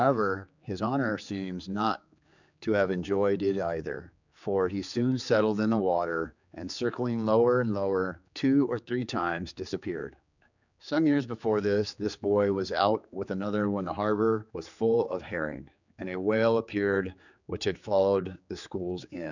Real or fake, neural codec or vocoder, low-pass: fake; codec, 16 kHz, 4 kbps, X-Codec, HuBERT features, trained on general audio; 7.2 kHz